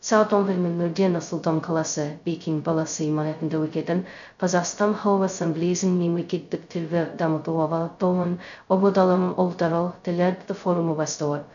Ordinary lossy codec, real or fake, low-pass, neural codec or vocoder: none; fake; 7.2 kHz; codec, 16 kHz, 0.2 kbps, FocalCodec